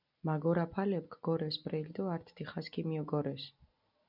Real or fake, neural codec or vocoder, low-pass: real; none; 5.4 kHz